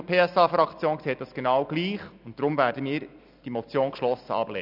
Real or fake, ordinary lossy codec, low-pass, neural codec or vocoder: real; none; 5.4 kHz; none